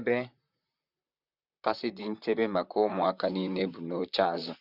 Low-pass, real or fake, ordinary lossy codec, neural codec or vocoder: 5.4 kHz; fake; none; vocoder, 44.1 kHz, 128 mel bands, Pupu-Vocoder